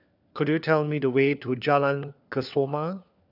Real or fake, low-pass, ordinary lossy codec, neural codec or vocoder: fake; 5.4 kHz; none; codec, 16 kHz, 4 kbps, FunCodec, trained on LibriTTS, 50 frames a second